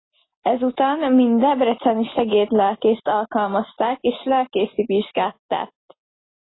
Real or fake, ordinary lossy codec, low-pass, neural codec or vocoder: real; AAC, 16 kbps; 7.2 kHz; none